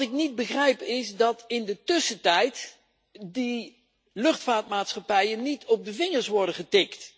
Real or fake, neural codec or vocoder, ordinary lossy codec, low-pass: real; none; none; none